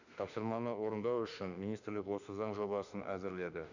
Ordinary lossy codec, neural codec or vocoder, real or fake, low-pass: none; autoencoder, 48 kHz, 32 numbers a frame, DAC-VAE, trained on Japanese speech; fake; 7.2 kHz